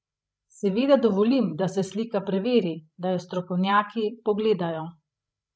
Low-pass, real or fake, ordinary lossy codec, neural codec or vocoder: none; fake; none; codec, 16 kHz, 16 kbps, FreqCodec, larger model